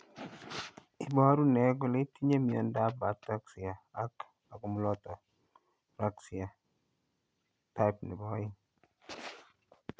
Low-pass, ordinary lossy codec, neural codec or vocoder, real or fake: none; none; none; real